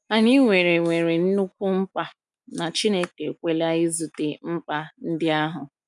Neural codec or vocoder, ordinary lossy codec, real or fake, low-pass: none; none; real; 10.8 kHz